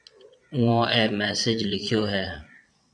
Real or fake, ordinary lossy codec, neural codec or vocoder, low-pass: fake; MP3, 96 kbps; vocoder, 22.05 kHz, 80 mel bands, Vocos; 9.9 kHz